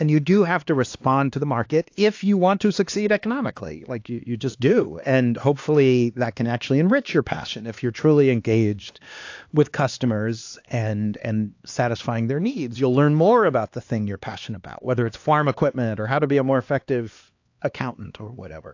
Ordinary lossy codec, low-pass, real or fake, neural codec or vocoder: AAC, 48 kbps; 7.2 kHz; fake; codec, 16 kHz, 2 kbps, X-Codec, HuBERT features, trained on LibriSpeech